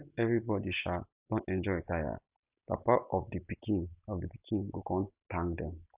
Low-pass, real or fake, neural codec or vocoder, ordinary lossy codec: 3.6 kHz; real; none; Opus, 64 kbps